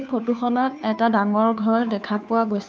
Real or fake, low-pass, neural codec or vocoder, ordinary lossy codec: fake; none; codec, 16 kHz, 2 kbps, FunCodec, trained on Chinese and English, 25 frames a second; none